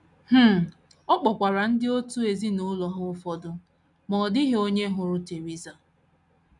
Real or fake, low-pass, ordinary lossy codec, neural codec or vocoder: real; 10.8 kHz; none; none